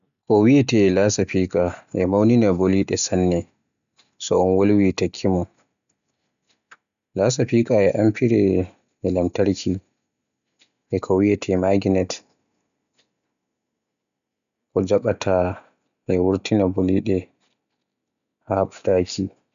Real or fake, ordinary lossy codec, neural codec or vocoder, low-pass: real; none; none; 7.2 kHz